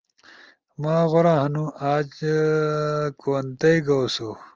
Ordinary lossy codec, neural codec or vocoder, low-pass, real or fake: Opus, 24 kbps; none; 7.2 kHz; real